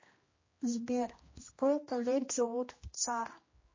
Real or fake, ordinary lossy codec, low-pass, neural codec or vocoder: fake; MP3, 32 kbps; 7.2 kHz; codec, 16 kHz, 1 kbps, X-Codec, HuBERT features, trained on general audio